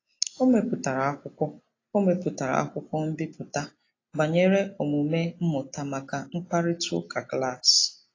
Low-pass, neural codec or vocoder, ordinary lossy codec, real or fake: 7.2 kHz; none; AAC, 32 kbps; real